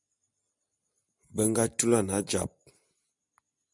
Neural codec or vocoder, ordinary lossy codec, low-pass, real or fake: none; MP3, 96 kbps; 10.8 kHz; real